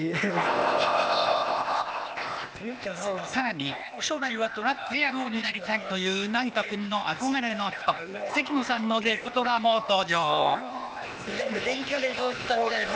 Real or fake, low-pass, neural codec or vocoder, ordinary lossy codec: fake; none; codec, 16 kHz, 0.8 kbps, ZipCodec; none